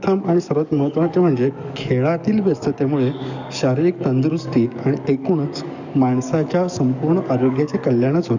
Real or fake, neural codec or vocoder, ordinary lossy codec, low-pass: fake; codec, 44.1 kHz, 7.8 kbps, DAC; none; 7.2 kHz